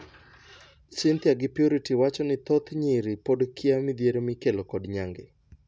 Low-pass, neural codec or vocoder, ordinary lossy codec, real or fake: none; none; none; real